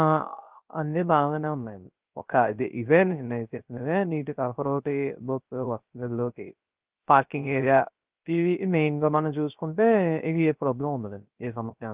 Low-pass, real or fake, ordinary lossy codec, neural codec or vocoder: 3.6 kHz; fake; Opus, 32 kbps; codec, 16 kHz, 0.3 kbps, FocalCodec